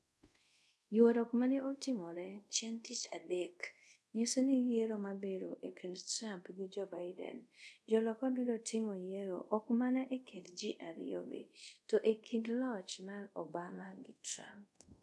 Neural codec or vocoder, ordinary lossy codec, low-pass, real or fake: codec, 24 kHz, 0.5 kbps, DualCodec; none; none; fake